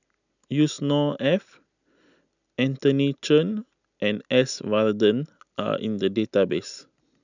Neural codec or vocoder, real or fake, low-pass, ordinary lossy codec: none; real; 7.2 kHz; none